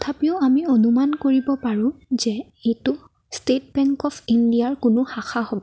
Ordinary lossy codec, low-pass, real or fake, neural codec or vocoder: none; none; real; none